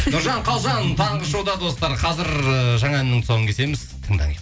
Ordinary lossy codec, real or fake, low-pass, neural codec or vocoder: none; real; none; none